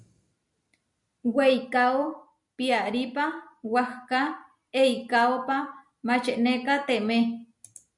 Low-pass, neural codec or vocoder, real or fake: 10.8 kHz; none; real